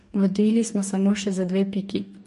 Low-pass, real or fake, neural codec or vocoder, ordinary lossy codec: 14.4 kHz; fake; codec, 44.1 kHz, 2.6 kbps, DAC; MP3, 48 kbps